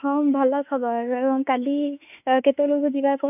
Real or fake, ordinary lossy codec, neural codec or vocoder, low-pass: fake; none; autoencoder, 48 kHz, 32 numbers a frame, DAC-VAE, trained on Japanese speech; 3.6 kHz